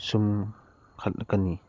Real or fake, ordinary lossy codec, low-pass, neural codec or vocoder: real; Opus, 24 kbps; 7.2 kHz; none